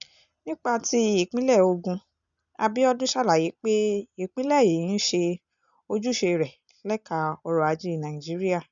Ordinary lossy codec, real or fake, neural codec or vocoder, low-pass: MP3, 96 kbps; real; none; 7.2 kHz